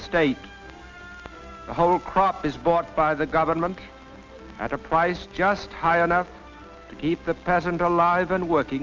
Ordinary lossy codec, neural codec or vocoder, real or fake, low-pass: Opus, 32 kbps; none; real; 7.2 kHz